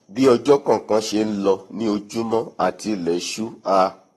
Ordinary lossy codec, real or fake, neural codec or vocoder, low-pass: AAC, 32 kbps; fake; codec, 44.1 kHz, 7.8 kbps, Pupu-Codec; 19.8 kHz